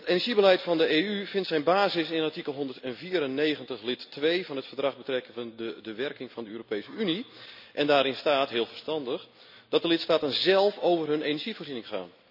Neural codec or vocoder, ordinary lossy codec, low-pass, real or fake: none; none; 5.4 kHz; real